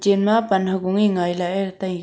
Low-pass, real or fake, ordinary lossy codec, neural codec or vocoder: none; real; none; none